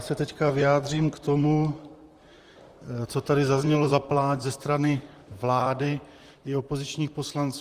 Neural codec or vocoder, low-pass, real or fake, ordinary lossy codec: vocoder, 44.1 kHz, 128 mel bands, Pupu-Vocoder; 14.4 kHz; fake; Opus, 24 kbps